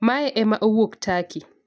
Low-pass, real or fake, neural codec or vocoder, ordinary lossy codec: none; real; none; none